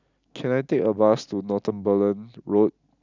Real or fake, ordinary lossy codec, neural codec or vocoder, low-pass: real; none; none; 7.2 kHz